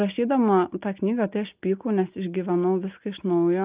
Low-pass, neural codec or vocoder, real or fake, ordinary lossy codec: 3.6 kHz; none; real; Opus, 24 kbps